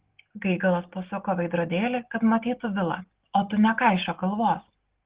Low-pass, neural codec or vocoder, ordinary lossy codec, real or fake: 3.6 kHz; none; Opus, 16 kbps; real